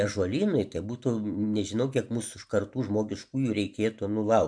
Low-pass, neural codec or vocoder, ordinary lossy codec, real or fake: 9.9 kHz; none; MP3, 64 kbps; real